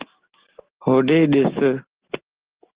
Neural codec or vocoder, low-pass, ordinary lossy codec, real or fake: none; 3.6 kHz; Opus, 16 kbps; real